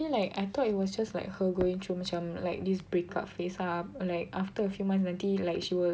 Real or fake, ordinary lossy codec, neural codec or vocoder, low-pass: real; none; none; none